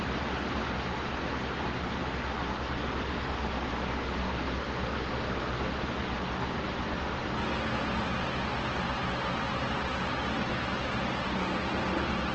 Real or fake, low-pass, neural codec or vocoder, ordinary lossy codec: real; 7.2 kHz; none; Opus, 16 kbps